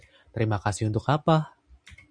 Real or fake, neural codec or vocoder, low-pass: real; none; 9.9 kHz